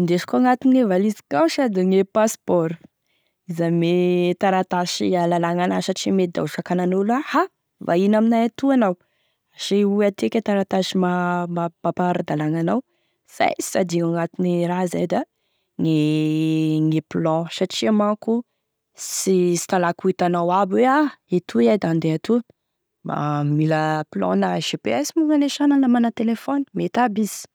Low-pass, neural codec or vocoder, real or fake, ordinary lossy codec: none; none; real; none